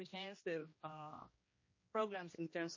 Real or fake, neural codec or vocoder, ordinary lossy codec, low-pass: fake; codec, 16 kHz, 1 kbps, X-Codec, HuBERT features, trained on general audio; MP3, 32 kbps; 7.2 kHz